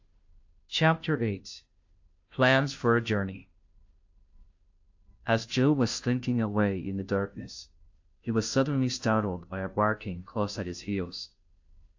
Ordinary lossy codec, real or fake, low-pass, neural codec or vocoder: AAC, 48 kbps; fake; 7.2 kHz; codec, 16 kHz, 0.5 kbps, FunCodec, trained on Chinese and English, 25 frames a second